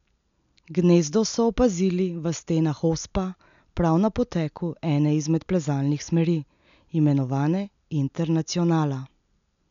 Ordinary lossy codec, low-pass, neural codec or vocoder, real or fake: none; 7.2 kHz; none; real